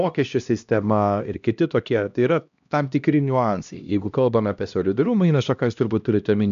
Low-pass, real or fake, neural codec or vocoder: 7.2 kHz; fake; codec, 16 kHz, 1 kbps, X-Codec, HuBERT features, trained on LibriSpeech